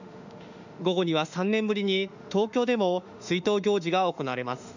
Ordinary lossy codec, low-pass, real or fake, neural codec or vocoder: none; 7.2 kHz; fake; autoencoder, 48 kHz, 32 numbers a frame, DAC-VAE, trained on Japanese speech